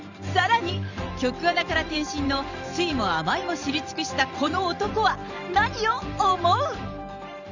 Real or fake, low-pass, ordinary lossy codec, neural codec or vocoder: fake; 7.2 kHz; none; vocoder, 44.1 kHz, 128 mel bands every 512 samples, BigVGAN v2